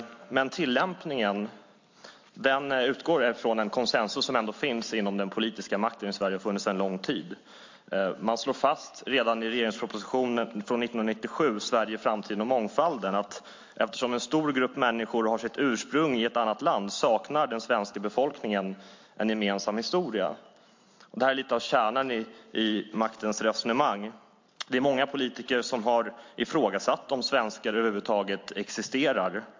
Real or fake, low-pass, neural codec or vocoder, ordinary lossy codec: real; 7.2 kHz; none; MP3, 48 kbps